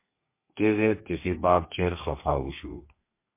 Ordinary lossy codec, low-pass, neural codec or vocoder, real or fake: MP3, 24 kbps; 3.6 kHz; codec, 32 kHz, 1.9 kbps, SNAC; fake